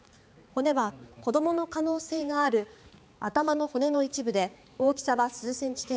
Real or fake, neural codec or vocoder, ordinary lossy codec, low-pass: fake; codec, 16 kHz, 4 kbps, X-Codec, HuBERT features, trained on balanced general audio; none; none